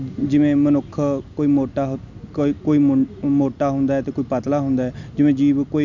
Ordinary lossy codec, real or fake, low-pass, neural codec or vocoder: none; real; 7.2 kHz; none